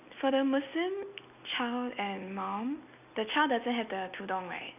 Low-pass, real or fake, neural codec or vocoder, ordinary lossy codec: 3.6 kHz; real; none; none